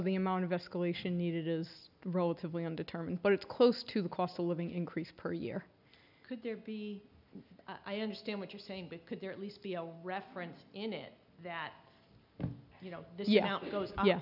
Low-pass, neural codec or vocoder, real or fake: 5.4 kHz; none; real